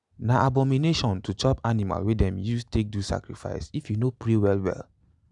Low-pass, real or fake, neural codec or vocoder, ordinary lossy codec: 10.8 kHz; real; none; none